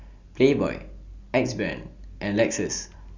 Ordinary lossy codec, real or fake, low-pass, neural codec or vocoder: Opus, 64 kbps; real; 7.2 kHz; none